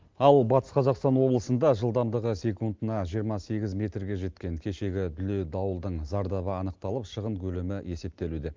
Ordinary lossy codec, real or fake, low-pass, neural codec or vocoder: Opus, 32 kbps; real; 7.2 kHz; none